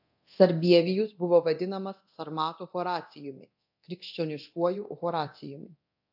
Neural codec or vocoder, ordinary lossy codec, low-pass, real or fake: codec, 24 kHz, 0.9 kbps, DualCodec; AAC, 48 kbps; 5.4 kHz; fake